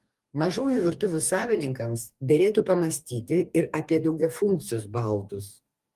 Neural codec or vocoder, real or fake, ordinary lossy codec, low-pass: codec, 44.1 kHz, 2.6 kbps, DAC; fake; Opus, 32 kbps; 14.4 kHz